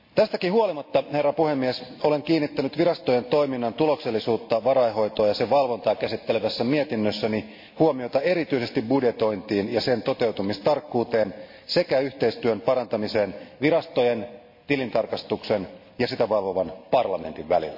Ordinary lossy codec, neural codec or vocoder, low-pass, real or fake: MP3, 32 kbps; none; 5.4 kHz; real